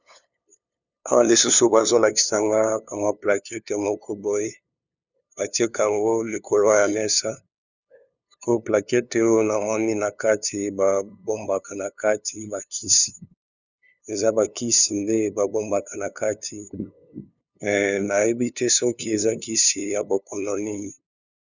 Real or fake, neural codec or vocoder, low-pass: fake; codec, 16 kHz, 2 kbps, FunCodec, trained on LibriTTS, 25 frames a second; 7.2 kHz